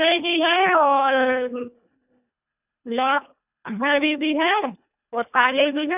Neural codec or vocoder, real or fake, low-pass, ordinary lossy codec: codec, 24 kHz, 1.5 kbps, HILCodec; fake; 3.6 kHz; none